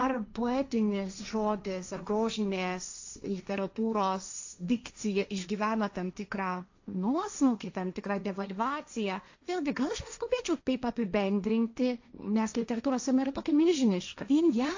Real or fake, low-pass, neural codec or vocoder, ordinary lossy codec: fake; 7.2 kHz; codec, 16 kHz, 1.1 kbps, Voila-Tokenizer; AAC, 48 kbps